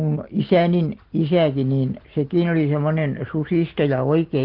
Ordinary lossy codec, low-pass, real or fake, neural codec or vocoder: Opus, 16 kbps; 5.4 kHz; real; none